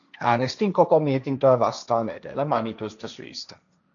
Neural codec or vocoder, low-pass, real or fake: codec, 16 kHz, 1.1 kbps, Voila-Tokenizer; 7.2 kHz; fake